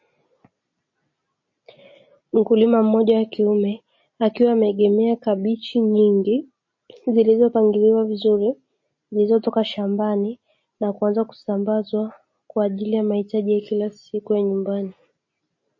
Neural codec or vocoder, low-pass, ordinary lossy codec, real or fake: none; 7.2 kHz; MP3, 32 kbps; real